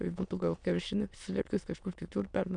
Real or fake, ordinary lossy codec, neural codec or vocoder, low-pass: fake; MP3, 96 kbps; autoencoder, 22.05 kHz, a latent of 192 numbers a frame, VITS, trained on many speakers; 9.9 kHz